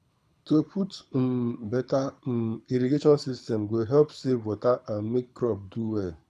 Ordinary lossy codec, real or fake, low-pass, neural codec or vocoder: none; fake; none; codec, 24 kHz, 6 kbps, HILCodec